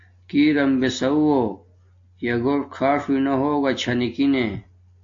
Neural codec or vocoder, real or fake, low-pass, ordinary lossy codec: none; real; 7.2 kHz; AAC, 48 kbps